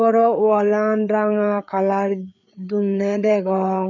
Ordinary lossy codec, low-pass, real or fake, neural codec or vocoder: none; 7.2 kHz; fake; codec, 16 kHz, 4 kbps, FreqCodec, larger model